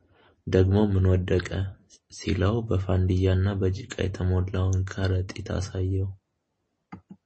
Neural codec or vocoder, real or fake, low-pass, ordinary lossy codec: vocoder, 44.1 kHz, 128 mel bands every 256 samples, BigVGAN v2; fake; 10.8 kHz; MP3, 32 kbps